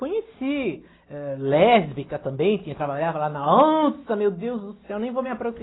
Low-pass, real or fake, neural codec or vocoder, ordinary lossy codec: 7.2 kHz; real; none; AAC, 16 kbps